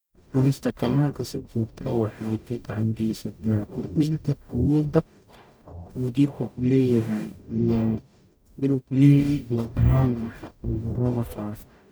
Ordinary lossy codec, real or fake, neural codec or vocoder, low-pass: none; fake; codec, 44.1 kHz, 0.9 kbps, DAC; none